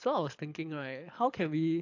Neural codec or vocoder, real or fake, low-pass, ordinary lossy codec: codec, 24 kHz, 6 kbps, HILCodec; fake; 7.2 kHz; AAC, 48 kbps